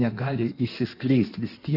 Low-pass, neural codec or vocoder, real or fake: 5.4 kHz; codec, 16 kHz in and 24 kHz out, 1.1 kbps, FireRedTTS-2 codec; fake